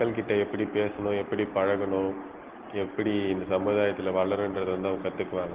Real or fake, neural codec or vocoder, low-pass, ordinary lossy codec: real; none; 3.6 kHz; Opus, 16 kbps